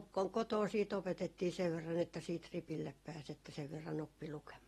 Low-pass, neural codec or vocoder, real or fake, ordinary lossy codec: 19.8 kHz; none; real; AAC, 32 kbps